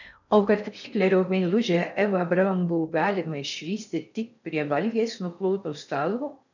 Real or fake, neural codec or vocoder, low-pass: fake; codec, 16 kHz in and 24 kHz out, 0.6 kbps, FocalCodec, streaming, 4096 codes; 7.2 kHz